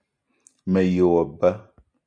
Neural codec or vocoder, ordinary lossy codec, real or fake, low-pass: none; MP3, 96 kbps; real; 9.9 kHz